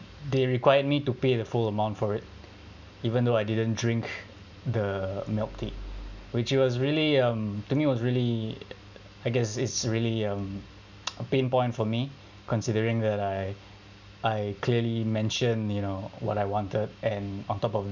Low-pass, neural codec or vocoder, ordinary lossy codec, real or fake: 7.2 kHz; none; none; real